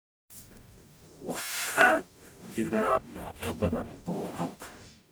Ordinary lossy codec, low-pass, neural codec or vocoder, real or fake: none; none; codec, 44.1 kHz, 0.9 kbps, DAC; fake